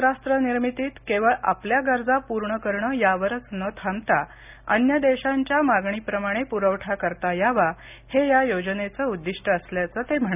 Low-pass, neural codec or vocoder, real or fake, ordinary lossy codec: 3.6 kHz; none; real; none